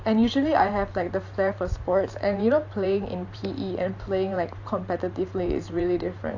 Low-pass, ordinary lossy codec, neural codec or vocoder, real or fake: 7.2 kHz; AAC, 48 kbps; vocoder, 44.1 kHz, 128 mel bands every 512 samples, BigVGAN v2; fake